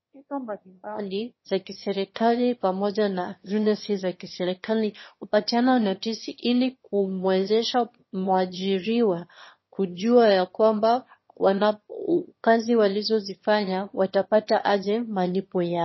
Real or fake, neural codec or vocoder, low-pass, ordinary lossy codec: fake; autoencoder, 22.05 kHz, a latent of 192 numbers a frame, VITS, trained on one speaker; 7.2 kHz; MP3, 24 kbps